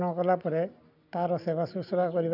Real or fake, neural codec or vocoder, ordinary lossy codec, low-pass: real; none; none; 5.4 kHz